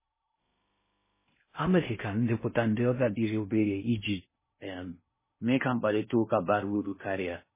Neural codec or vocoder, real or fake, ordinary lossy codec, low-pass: codec, 16 kHz in and 24 kHz out, 0.6 kbps, FocalCodec, streaming, 2048 codes; fake; MP3, 16 kbps; 3.6 kHz